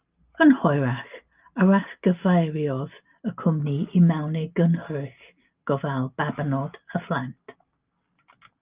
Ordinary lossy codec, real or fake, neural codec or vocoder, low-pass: Opus, 24 kbps; real; none; 3.6 kHz